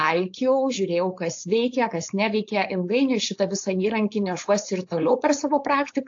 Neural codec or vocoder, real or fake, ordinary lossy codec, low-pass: codec, 16 kHz, 4.8 kbps, FACodec; fake; AAC, 48 kbps; 7.2 kHz